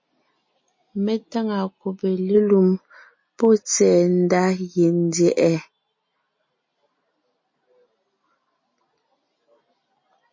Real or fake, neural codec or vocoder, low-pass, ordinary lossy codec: real; none; 7.2 kHz; MP3, 32 kbps